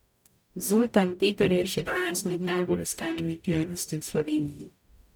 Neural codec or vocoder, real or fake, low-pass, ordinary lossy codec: codec, 44.1 kHz, 0.9 kbps, DAC; fake; none; none